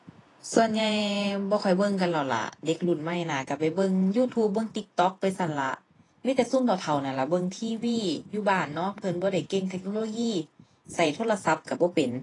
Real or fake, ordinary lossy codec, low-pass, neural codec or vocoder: fake; AAC, 32 kbps; 10.8 kHz; vocoder, 48 kHz, 128 mel bands, Vocos